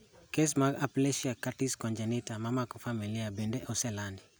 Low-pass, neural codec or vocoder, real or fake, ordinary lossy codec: none; none; real; none